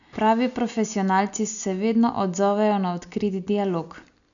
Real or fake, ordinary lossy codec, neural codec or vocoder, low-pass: real; none; none; 7.2 kHz